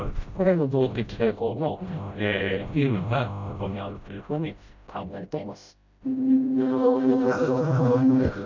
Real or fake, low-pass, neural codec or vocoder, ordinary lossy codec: fake; 7.2 kHz; codec, 16 kHz, 0.5 kbps, FreqCodec, smaller model; none